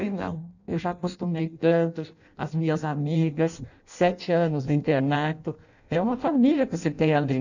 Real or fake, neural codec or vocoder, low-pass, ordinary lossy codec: fake; codec, 16 kHz in and 24 kHz out, 0.6 kbps, FireRedTTS-2 codec; 7.2 kHz; none